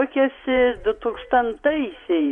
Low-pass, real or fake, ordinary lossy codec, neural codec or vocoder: 10.8 kHz; fake; MP3, 64 kbps; vocoder, 24 kHz, 100 mel bands, Vocos